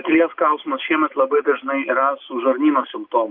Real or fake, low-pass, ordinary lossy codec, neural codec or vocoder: real; 5.4 kHz; Opus, 24 kbps; none